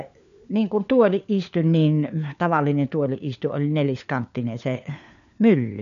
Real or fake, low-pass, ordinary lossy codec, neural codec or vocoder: fake; 7.2 kHz; none; codec, 16 kHz, 4 kbps, FunCodec, trained on LibriTTS, 50 frames a second